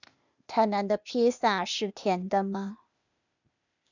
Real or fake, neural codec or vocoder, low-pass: fake; codec, 16 kHz, 0.8 kbps, ZipCodec; 7.2 kHz